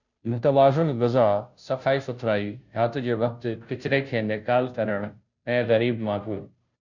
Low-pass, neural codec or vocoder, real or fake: 7.2 kHz; codec, 16 kHz, 0.5 kbps, FunCodec, trained on Chinese and English, 25 frames a second; fake